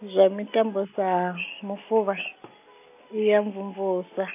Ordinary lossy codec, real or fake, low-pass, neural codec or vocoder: none; real; 3.6 kHz; none